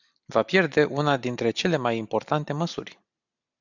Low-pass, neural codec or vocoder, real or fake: 7.2 kHz; none; real